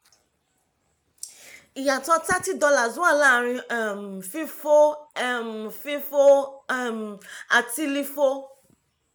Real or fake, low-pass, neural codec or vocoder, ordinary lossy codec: real; none; none; none